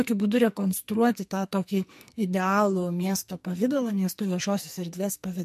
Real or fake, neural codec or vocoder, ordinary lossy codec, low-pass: fake; codec, 44.1 kHz, 2.6 kbps, SNAC; MP3, 64 kbps; 14.4 kHz